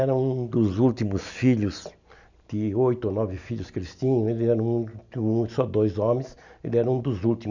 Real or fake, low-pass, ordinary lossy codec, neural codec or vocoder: real; 7.2 kHz; none; none